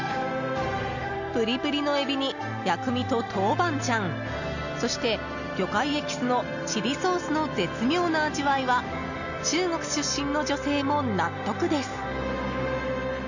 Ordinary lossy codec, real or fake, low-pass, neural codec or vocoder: none; real; 7.2 kHz; none